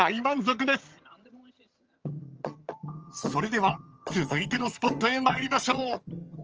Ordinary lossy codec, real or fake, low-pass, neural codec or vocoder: Opus, 24 kbps; fake; 7.2 kHz; vocoder, 22.05 kHz, 80 mel bands, HiFi-GAN